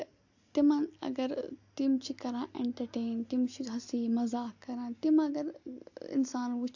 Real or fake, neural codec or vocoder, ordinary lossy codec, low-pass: real; none; AAC, 48 kbps; 7.2 kHz